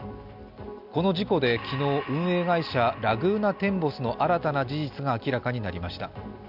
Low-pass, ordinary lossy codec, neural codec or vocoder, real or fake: 5.4 kHz; none; none; real